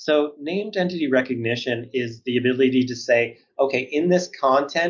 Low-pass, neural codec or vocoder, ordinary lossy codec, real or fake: 7.2 kHz; none; MP3, 64 kbps; real